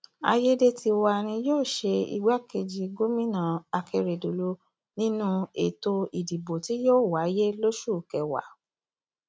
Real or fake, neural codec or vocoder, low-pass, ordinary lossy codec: real; none; none; none